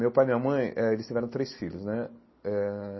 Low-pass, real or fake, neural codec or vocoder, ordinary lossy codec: 7.2 kHz; real; none; MP3, 24 kbps